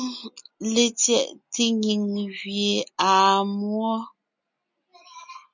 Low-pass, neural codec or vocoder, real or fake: 7.2 kHz; none; real